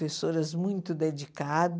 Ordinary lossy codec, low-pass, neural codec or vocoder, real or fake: none; none; none; real